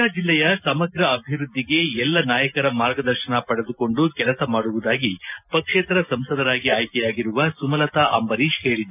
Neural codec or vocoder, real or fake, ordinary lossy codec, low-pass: none; real; none; 3.6 kHz